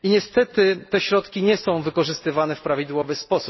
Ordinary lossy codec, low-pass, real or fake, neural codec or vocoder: MP3, 24 kbps; 7.2 kHz; real; none